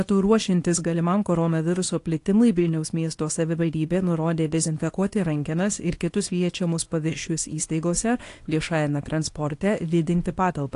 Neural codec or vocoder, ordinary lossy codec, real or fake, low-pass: codec, 24 kHz, 0.9 kbps, WavTokenizer, small release; AAC, 48 kbps; fake; 10.8 kHz